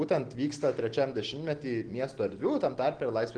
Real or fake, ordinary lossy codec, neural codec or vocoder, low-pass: real; Opus, 16 kbps; none; 9.9 kHz